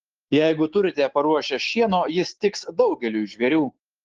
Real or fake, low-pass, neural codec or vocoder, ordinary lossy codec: fake; 7.2 kHz; codec, 16 kHz, 6 kbps, DAC; Opus, 16 kbps